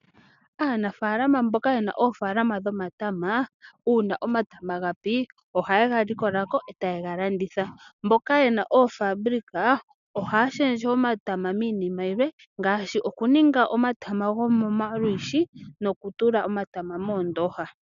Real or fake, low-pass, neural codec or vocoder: real; 7.2 kHz; none